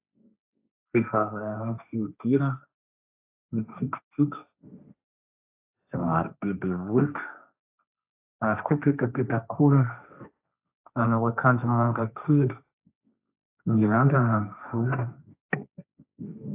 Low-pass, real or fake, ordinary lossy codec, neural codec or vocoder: 3.6 kHz; fake; AAC, 32 kbps; codec, 16 kHz, 1.1 kbps, Voila-Tokenizer